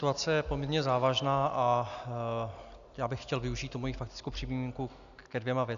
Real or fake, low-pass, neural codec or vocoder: real; 7.2 kHz; none